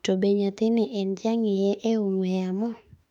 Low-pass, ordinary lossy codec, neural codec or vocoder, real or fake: 19.8 kHz; none; autoencoder, 48 kHz, 32 numbers a frame, DAC-VAE, trained on Japanese speech; fake